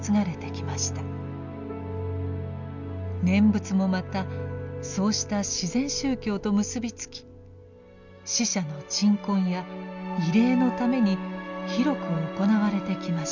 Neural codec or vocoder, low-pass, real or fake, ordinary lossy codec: none; 7.2 kHz; real; none